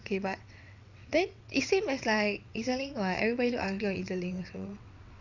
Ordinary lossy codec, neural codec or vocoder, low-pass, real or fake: none; vocoder, 22.05 kHz, 80 mel bands, WaveNeXt; 7.2 kHz; fake